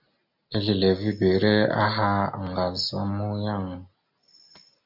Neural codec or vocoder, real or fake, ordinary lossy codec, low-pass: none; real; MP3, 48 kbps; 5.4 kHz